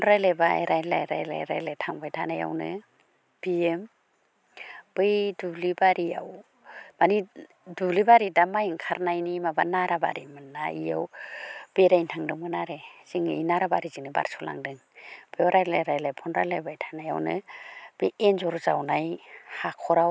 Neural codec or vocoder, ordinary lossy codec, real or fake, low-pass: none; none; real; none